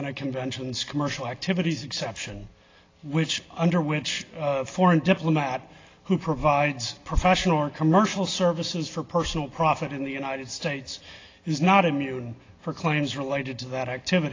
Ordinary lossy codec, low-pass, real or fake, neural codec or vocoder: AAC, 32 kbps; 7.2 kHz; real; none